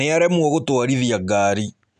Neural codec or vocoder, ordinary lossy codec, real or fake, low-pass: none; none; real; 9.9 kHz